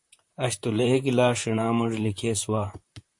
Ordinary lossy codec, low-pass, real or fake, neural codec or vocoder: MP3, 48 kbps; 10.8 kHz; fake; vocoder, 44.1 kHz, 128 mel bands, Pupu-Vocoder